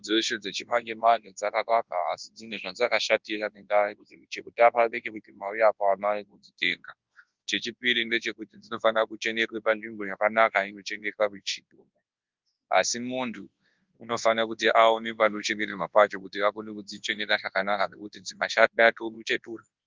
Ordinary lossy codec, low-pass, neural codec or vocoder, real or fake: Opus, 32 kbps; 7.2 kHz; codec, 24 kHz, 0.9 kbps, WavTokenizer, large speech release; fake